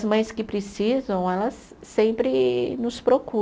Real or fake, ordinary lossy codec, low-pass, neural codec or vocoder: real; none; none; none